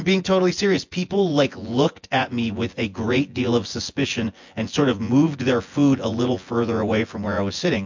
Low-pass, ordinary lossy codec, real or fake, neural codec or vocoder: 7.2 kHz; MP3, 48 kbps; fake; vocoder, 24 kHz, 100 mel bands, Vocos